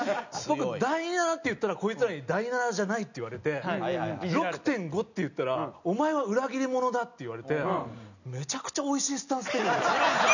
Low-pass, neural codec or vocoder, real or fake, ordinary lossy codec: 7.2 kHz; none; real; none